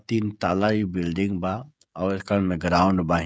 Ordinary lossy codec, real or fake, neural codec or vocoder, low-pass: none; fake; codec, 16 kHz, 16 kbps, FreqCodec, smaller model; none